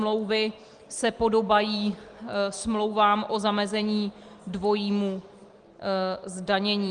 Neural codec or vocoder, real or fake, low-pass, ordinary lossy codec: none; real; 9.9 kHz; Opus, 24 kbps